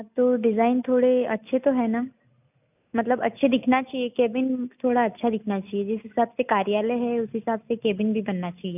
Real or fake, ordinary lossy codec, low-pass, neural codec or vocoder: real; none; 3.6 kHz; none